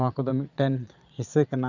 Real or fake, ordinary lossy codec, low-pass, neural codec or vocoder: fake; none; 7.2 kHz; vocoder, 22.05 kHz, 80 mel bands, Vocos